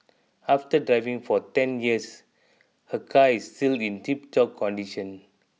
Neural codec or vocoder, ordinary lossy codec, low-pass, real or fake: none; none; none; real